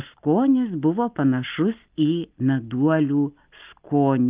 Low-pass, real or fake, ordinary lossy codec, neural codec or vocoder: 3.6 kHz; real; Opus, 32 kbps; none